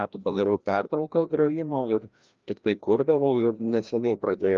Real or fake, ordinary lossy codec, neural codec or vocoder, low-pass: fake; Opus, 32 kbps; codec, 16 kHz, 1 kbps, FreqCodec, larger model; 7.2 kHz